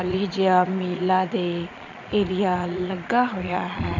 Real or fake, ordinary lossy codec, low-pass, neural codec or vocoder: fake; none; 7.2 kHz; vocoder, 22.05 kHz, 80 mel bands, Vocos